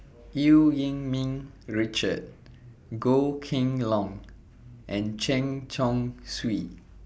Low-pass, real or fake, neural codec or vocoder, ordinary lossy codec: none; real; none; none